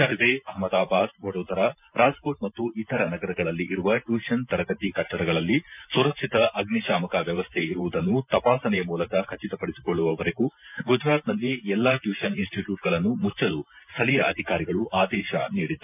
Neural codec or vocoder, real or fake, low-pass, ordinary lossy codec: vocoder, 44.1 kHz, 128 mel bands every 512 samples, BigVGAN v2; fake; 3.6 kHz; none